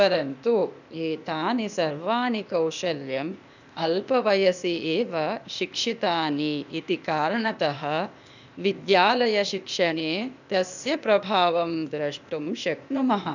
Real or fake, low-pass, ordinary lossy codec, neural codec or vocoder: fake; 7.2 kHz; none; codec, 16 kHz, 0.8 kbps, ZipCodec